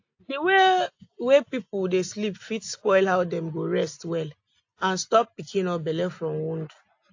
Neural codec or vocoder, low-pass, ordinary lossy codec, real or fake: none; 7.2 kHz; AAC, 48 kbps; real